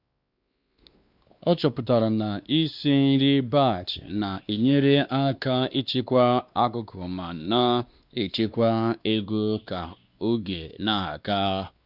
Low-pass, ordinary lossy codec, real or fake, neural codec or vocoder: 5.4 kHz; Opus, 64 kbps; fake; codec, 16 kHz, 2 kbps, X-Codec, WavLM features, trained on Multilingual LibriSpeech